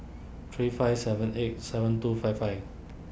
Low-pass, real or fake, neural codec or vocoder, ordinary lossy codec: none; real; none; none